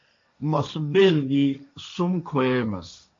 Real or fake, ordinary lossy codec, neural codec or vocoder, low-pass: fake; MP3, 48 kbps; codec, 16 kHz, 1.1 kbps, Voila-Tokenizer; 7.2 kHz